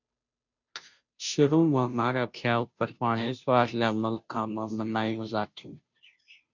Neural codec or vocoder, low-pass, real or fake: codec, 16 kHz, 0.5 kbps, FunCodec, trained on Chinese and English, 25 frames a second; 7.2 kHz; fake